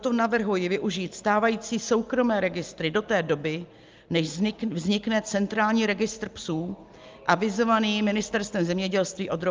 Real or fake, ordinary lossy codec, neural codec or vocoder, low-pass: real; Opus, 24 kbps; none; 7.2 kHz